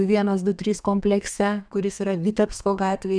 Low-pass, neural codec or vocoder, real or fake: 9.9 kHz; codec, 32 kHz, 1.9 kbps, SNAC; fake